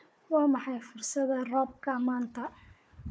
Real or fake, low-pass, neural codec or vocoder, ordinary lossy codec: fake; none; codec, 16 kHz, 4 kbps, FunCodec, trained on Chinese and English, 50 frames a second; none